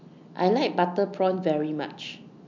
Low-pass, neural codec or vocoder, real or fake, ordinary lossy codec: 7.2 kHz; none; real; MP3, 64 kbps